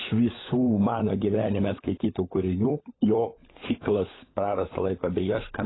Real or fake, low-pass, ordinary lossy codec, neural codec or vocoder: fake; 7.2 kHz; AAC, 16 kbps; codec, 16 kHz, 16 kbps, FunCodec, trained on LibriTTS, 50 frames a second